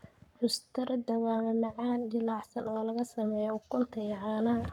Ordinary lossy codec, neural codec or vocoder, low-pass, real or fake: none; codec, 44.1 kHz, 7.8 kbps, Pupu-Codec; 19.8 kHz; fake